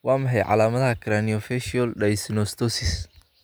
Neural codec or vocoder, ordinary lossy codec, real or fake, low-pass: none; none; real; none